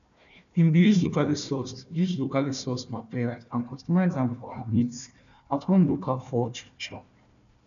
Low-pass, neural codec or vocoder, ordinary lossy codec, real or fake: 7.2 kHz; codec, 16 kHz, 1 kbps, FunCodec, trained on Chinese and English, 50 frames a second; none; fake